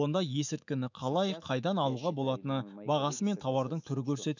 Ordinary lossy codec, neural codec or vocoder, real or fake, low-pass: none; autoencoder, 48 kHz, 128 numbers a frame, DAC-VAE, trained on Japanese speech; fake; 7.2 kHz